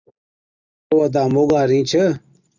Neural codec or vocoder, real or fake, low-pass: none; real; 7.2 kHz